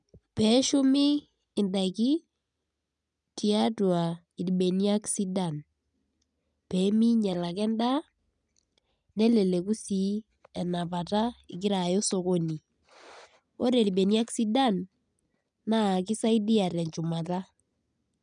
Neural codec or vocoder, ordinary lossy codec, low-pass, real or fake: none; none; 9.9 kHz; real